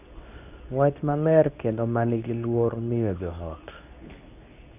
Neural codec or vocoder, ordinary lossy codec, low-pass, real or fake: codec, 24 kHz, 0.9 kbps, WavTokenizer, medium speech release version 2; none; 3.6 kHz; fake